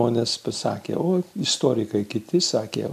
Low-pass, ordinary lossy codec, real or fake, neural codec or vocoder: 14.4 kHz; AAC, 96 kbps; real; none